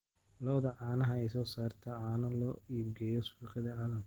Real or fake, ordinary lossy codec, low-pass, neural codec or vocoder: real; Opus, 16 kbps; 19.8 kHz; none